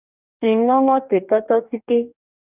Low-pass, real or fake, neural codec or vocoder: 3.6 kHz; fake; codec, 44.1 kHz, 2.6 kbps, DAC